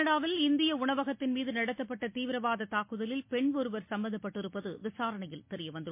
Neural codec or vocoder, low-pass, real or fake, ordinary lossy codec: none; 3.6 kHz; real; MP3, 24 kbps